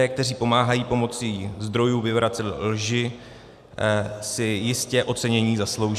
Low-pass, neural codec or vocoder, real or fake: 14.4 kHz; none; real